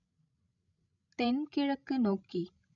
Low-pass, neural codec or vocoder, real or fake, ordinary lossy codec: 7.2 kHz; codec, 16 kHz, 16 kbps, FreqCodec, larger model; fake; none